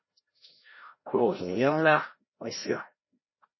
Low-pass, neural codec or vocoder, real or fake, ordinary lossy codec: 7.2 kHz; codec, 16 kHz, 0.5 kbps, FreqCodec, larger model; fake; MP3, 24 kbps